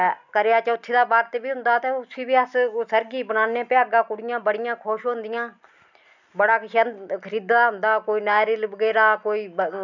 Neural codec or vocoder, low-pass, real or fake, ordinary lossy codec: none; 7.2 kHz; real; none